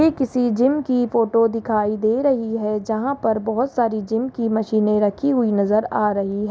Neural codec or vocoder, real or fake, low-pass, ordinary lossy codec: none; real; none; none